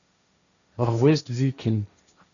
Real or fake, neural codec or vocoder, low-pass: fake; codec, 16 kHz, 1.1 kbps, Voila-Tokenizer; 7.2 kHz